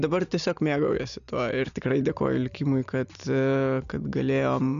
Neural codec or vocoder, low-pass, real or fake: none; 7.2 kHz; real